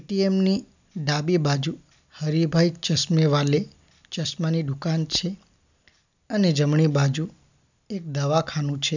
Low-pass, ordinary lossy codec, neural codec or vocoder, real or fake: 7.2 kHz; none; none; real